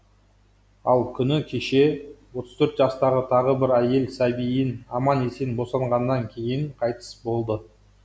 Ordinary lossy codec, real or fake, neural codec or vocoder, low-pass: none; real; none; none